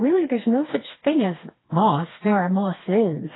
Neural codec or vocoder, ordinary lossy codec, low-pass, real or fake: codec, 16 kHz, 2 kbps, FreqCodec, smaller model; AAC, 16 kbps; 7.2 kHz; fake